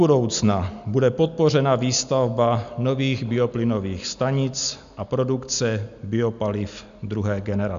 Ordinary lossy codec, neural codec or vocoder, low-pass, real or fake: AAC, 96 kbps; none; 7.2 kHz; real